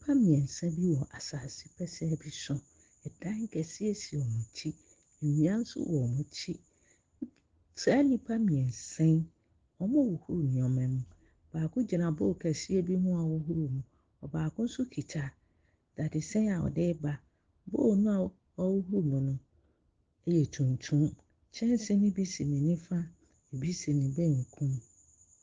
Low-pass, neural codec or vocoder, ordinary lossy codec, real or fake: 7.2 kHz; none; Opus, 16 kbps; real